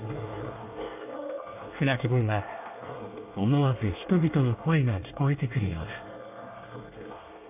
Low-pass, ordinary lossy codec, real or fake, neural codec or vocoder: 3.6 kHz; AAC, 32 kbps; fake; codec, 24 kHz, 1 kbps, SNAC